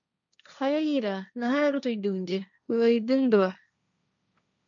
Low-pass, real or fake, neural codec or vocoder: 7.2 kHz; fake; codec, 16 kHz, 1.1 kbps, Voila-Tokenizer